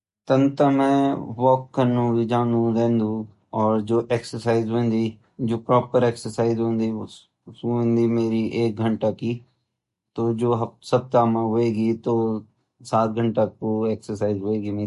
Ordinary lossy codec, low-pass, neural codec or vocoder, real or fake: MP3, 48 kbps; 14.4 kHz; none; real